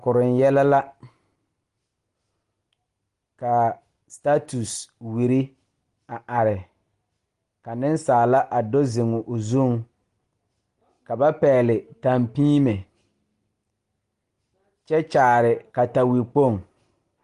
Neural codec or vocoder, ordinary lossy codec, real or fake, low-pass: none; Opus, 24 kbps; real; 10.8 kHz